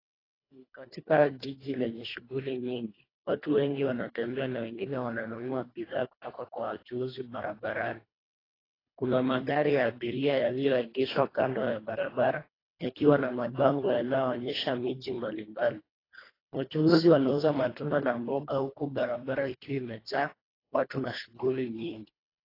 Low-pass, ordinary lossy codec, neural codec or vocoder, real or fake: 5.4 kHz; AAC, 24 kbps; codec, 24 kHz, 1.5 kbps, HILCodec; fake